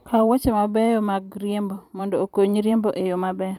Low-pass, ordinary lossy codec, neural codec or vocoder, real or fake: 19.8 kHz; none; vocoder, 44.1 kHz, 128 mel bands every 512 samples, BigVGAN v2; fake